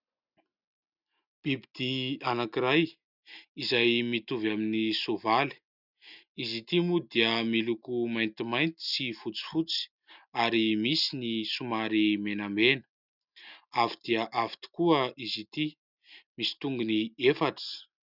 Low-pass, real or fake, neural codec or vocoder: 5.4 kHz; real; none